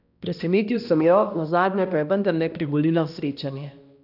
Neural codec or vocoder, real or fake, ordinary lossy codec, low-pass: codec, 16 kHz, 1 kbps, X-Codec, HuBERT features, trained on balanced general audio; fake; none; 5.4 kHz